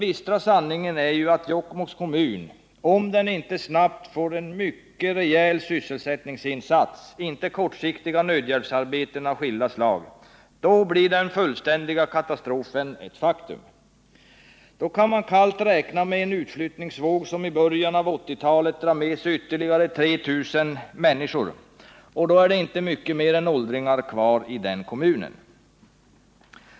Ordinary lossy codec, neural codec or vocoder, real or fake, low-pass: none; none; real; none